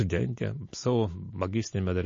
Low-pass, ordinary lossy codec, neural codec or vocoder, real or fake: 7.2 kHz; MP3, 32 kbps; none; real